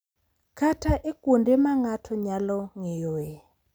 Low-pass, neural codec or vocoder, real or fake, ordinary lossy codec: none; none; real; none